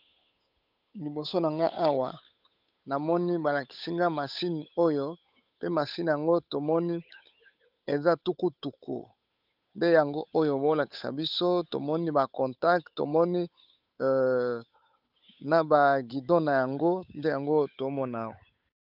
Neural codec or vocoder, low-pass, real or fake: codec, 16 kHz, 8 kbps, FunCodec, trained on Chinese and English, 25 frames a second; 5.4 kHz; fake